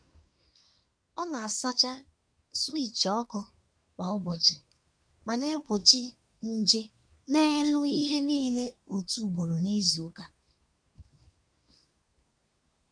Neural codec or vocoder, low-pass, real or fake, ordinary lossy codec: codec, 24 kHz, 1 kbps, SNAC; 9.9 kHz; fake; none